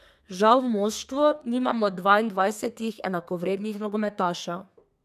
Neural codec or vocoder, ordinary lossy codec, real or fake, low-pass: codec, 32 kHz, 1.9 kbps, SNAC; none; fake; 14.4 kHz